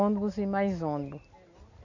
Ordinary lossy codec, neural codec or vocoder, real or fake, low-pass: none; none; real; 7.2 kHz